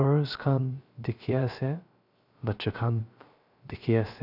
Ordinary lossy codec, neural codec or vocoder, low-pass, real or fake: none; codec, 16 kHz, 0.3 kbps, FocalCodec; 5.4 kHz; fake